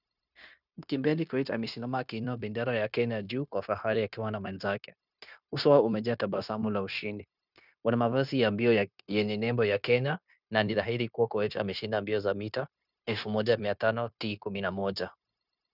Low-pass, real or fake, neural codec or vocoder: 5.4 kHz; fake; codec, 16 kHz, 0.9 kbps, LongCat-Audio-Codec